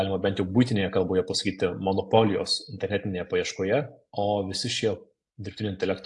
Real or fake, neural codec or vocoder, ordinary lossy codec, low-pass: real; none; AAC, 64 kbps; 10.8 kHz